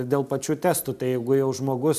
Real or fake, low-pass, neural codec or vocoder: real; 14.4 kHz; none